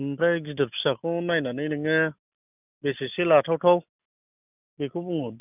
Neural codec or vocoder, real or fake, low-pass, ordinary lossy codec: none; real; 3.6 kHz; none